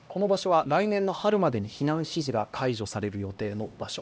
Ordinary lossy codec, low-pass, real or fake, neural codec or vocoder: none; none; fake; codec, 16 kHz, 1 kbps, X-Codec, HuBERT features, trained on LibriSpeech